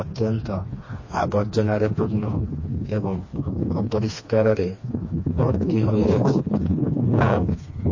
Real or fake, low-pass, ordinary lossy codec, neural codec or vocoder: fake; 7.2 kHz; MP3, 32 kbps; codec, 16 kHz, 2 kbps, FreqCodec, smaller model